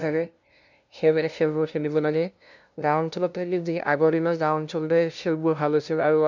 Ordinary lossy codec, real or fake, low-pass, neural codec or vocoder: none; fake; 7.2 kHz; codec, 16 kHz, 0.5 kbps, FunCodec, trained on LibriTTS, 25 frames a second